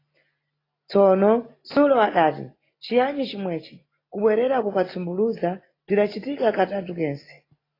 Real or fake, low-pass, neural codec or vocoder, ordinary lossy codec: real; 5.4 kHz; none; AAC, 24 kbps